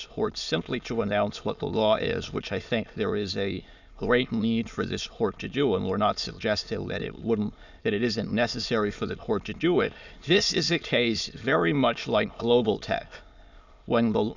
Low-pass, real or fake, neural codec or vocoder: 7.2 kHz; fake; autoencoder, 22.05 kHz, a latent of 192 numbers a frame, VITS, trained on many speakers